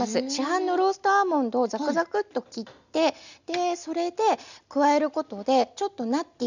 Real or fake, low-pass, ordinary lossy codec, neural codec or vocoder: real; 7.2 kHz; none; none